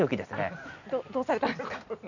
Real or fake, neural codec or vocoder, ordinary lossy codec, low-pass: real; none; none; 7.2 kHz